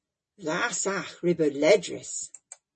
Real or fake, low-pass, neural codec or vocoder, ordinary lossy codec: real; 10.8 kHz; none; MP3, 32 kbps